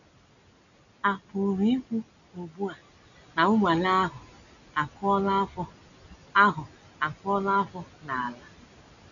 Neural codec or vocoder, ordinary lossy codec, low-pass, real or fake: none; none; 7.2 kHz; real